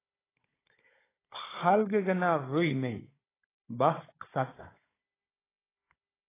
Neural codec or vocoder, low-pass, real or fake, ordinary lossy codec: codec, 16 kHz, 16 kbps, FunCodec, trained on Chinese and English, 50 frames a second; 3.6 kHz; fake; AAC, 16 kbps